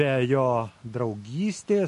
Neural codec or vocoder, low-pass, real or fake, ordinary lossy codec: none; 14.4 kHz; real; MP3, 48 kbps